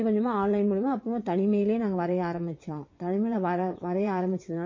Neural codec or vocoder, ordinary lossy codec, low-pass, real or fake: vocoder, 22.05 kHz, 80 mel bands, WaveNeXt; MP3, 32 kbps; 7.2 kHz; fake